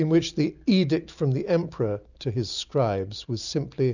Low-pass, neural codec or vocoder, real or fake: 7.2 kHz; none; real